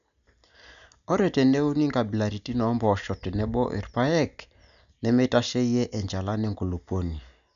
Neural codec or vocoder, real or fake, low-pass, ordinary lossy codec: none; real; 7.2 kHz; none